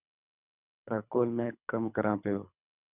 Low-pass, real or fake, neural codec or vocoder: 3.6 kHz; fake; codec, 24 kHz, 3 kbps, HILCodec